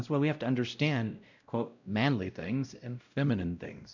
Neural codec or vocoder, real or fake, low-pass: codec, 16 kHz, 0.5 kbps, X-Codec, WavLM features, trained on Multilingual LibriSpeech; fake; 7.2 kHz